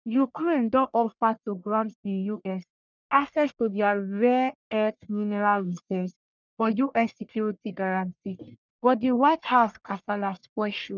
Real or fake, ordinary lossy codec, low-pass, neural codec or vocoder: fake; none; 7.2 kHz; codec, 44.1 kHz, 1.7 kbps, Pupu-Codec